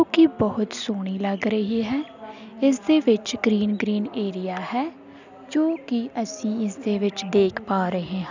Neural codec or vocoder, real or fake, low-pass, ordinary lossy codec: none; real; 7.2 kHz; none